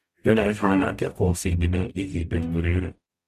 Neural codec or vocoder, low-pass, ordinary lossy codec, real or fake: codec, 44.1 kHz, 0.9 kbps, DAC; 14.4 kHz; Opus, 64 kbps; fake